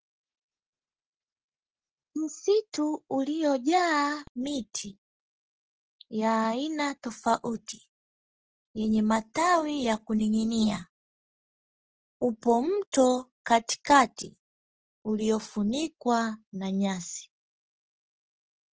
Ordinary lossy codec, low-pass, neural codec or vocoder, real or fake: Opus, 16 kbps; 7.2 kHz; none; real